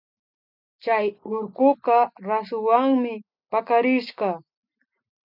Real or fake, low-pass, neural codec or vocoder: real; 5.4 kHz; none